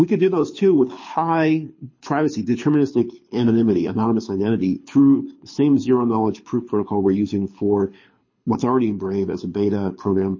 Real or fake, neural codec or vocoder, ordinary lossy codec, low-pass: fake; codec, 24 kHz, 6 kbps, HILCodec; MP3, 32 kbps; 7.2 kHz